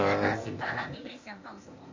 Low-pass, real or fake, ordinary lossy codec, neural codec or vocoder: 7.2 kHz; fake; MP3, 32 kbps; codec, 16 kHz, 0.8 kbps, ZipCodec